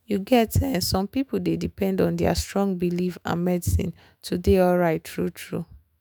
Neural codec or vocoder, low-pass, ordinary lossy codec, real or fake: autoencoder, 48 kHz, 128 numbers a frame, DAC-VAE, trained on Japanese speech; none; none; fake